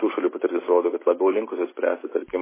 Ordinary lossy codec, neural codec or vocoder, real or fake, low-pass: MP3, 16 kbps; none; real; 3.6 kHz